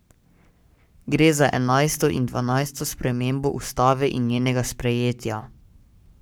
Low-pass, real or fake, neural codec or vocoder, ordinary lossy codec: none; fake; codec, 44.1 kHz, 7.8 kbps, Pupu-Codec; none